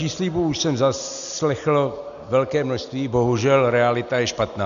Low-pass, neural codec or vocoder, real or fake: 7.2 kHz; none; real